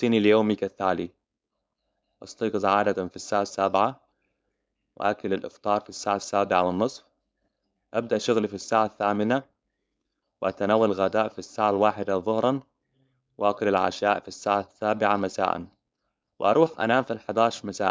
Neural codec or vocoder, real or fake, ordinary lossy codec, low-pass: codec, 16 kHz, 4.8 kbps, FACodec; fake; none; none